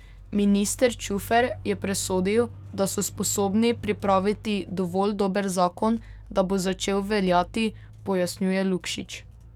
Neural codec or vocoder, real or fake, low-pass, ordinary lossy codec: codec, 44.1 kHz, 7.8 kbps, DAC; fake; 19.8 kHz; none